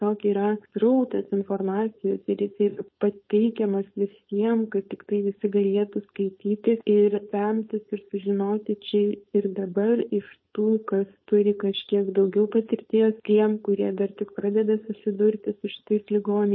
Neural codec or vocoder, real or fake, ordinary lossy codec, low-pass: codec, 16 kHz, 4.8 kbps, FACodec; fake; MP3, 24 kbps; 7.2 kHz